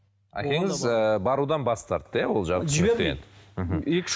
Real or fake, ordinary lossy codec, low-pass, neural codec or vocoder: real; none; none; none